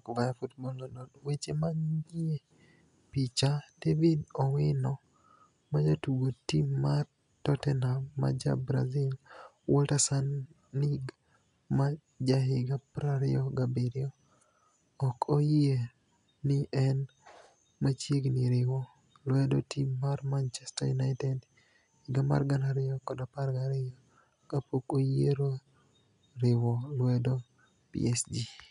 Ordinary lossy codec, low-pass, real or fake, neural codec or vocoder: none; 10.8 kHz; real; none